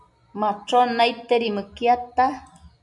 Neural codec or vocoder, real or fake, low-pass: none; real; 10.8 kHz